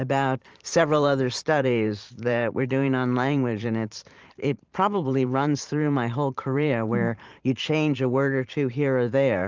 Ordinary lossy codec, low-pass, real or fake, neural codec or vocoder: Opus, 24 kbps; 7.2 kHz; fake; vocoder, 44.1 kHz, 128 mel bands every 512 samples, BigVGAN v2